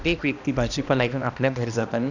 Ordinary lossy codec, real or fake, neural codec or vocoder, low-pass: none; fake; codec, 16 kHz, 1 kbps, X-Codec, HuBERT features, trained on balanced general audio; 7.2 kHz